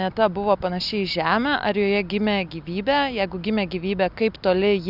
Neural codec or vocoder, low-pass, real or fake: none; 5.4 kHz; real